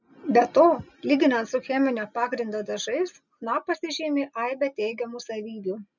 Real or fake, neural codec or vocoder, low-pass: real; none; 7.2 kHz